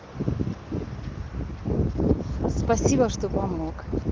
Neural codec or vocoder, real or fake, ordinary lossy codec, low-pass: none; real; Opus, 16 kbps; 7.2 kHz